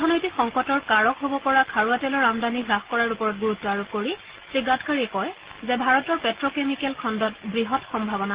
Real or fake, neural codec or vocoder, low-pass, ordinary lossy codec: real; none; 3.6 kHz; Opus, 16 kbps